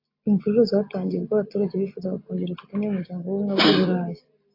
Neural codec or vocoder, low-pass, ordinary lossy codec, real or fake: none; 5.4 kHz; AAC, 32 kbps; real